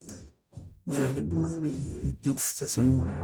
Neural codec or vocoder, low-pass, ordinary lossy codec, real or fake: codec, 44.1 kHz, 0.9 kbps, DAC; none; none; fake